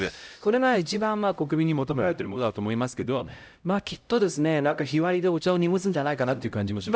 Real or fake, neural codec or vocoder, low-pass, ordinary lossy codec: fake; codec, 16 kHz, 0.5 kbps, X-Codec, HuBERT features, trained on LibriSpeech; none; none